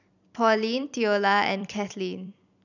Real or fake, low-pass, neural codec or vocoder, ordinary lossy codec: fake; 7.2 kHz; vocoder, 44.1 kHz, 128 mel bands every 256 samples, BigVGAN v2; none